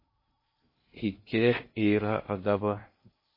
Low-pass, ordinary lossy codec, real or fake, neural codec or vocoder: 5.4 kHz; MP3, 24 kbps; fake; codec, 16 kHz in and 24 kHz out, 0.6 kbps, FocalCodec, streaming, 2048 codes